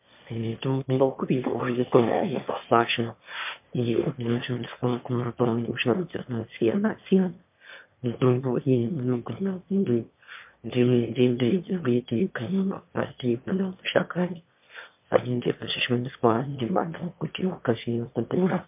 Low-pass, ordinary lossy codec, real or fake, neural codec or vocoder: 3.6 kHz; MP3, 32 kbps; fake; autoencoder, 22.05 kHz, a latent of 192 numbers a frame, VITS, trained on one speaker